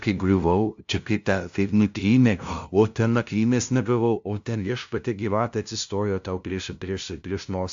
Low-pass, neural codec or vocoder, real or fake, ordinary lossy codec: 7.2 kHz; codec, 16 kHz, 0.5 kbps, FunCodec, trained on LibriTTS, 25 frames a second; fake; AAC, 64 kbps